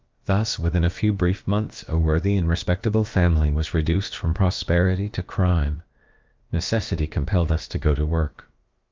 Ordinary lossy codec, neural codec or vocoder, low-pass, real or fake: Opus, 32 kbps; codec, 16 kHz, about 1 kbps, DyCAST, with the encoder's durations; 7.2 kHz; fake